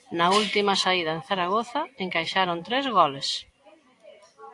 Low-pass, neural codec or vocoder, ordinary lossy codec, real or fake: 10.8 kHz; none; AAC, 48 kbps; real